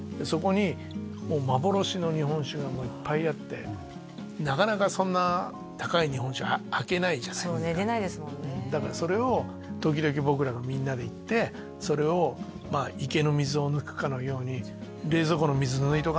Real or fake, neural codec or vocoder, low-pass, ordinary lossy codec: real; none; none; none